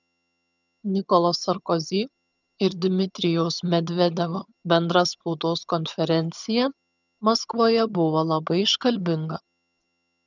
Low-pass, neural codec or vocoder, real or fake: 7.2 kHz; vocoder, 22.05 kHz, 80 mel bands, HiFi-GAN; fake